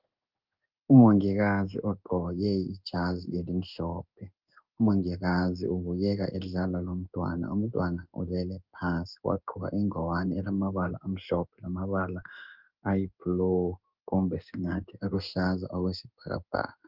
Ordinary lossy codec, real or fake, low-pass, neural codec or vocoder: Opus, 24 kbps; fake; 5.4 kHz; codec, 16 kHz in and 24 kHz out, 1 kbps, XY-Tokenizer